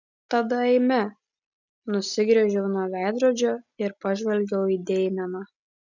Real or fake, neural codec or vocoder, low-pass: real; none; 7.2 kHz